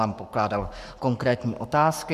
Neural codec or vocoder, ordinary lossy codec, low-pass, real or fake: codec, 44.1 kHz, 7.8 kbps, Pupu-Codec; MP3, 96 kbps; 14.4 kHz; fake